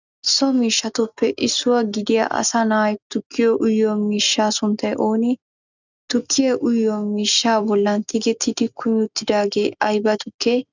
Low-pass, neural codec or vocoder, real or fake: 7.2 kHz; none; real